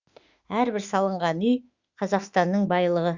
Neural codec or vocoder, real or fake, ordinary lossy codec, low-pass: autoencoder, 48 kHz, 32 numbers a frame, DAC-VAE, trained on Japanese speech; fake; Opus, 64 kbps; 7.2 kHz